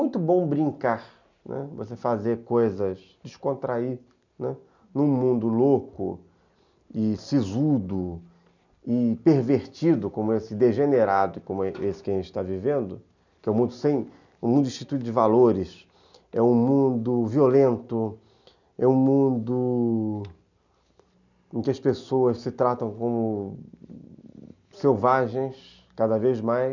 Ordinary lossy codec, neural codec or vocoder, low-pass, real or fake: none; none; 7.2 kHz; real